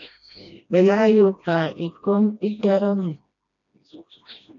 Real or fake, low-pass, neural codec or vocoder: fake; 7.2 kHz; codec, 16 kHz, 1 kbps, FreqCodec, smaller model